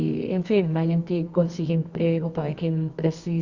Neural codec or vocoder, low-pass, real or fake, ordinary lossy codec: codec, 24 kHz, 0.9 kbps, WavTokenizer, medium music audio release; 7.2 kHz; fake; Opus, 64 kbps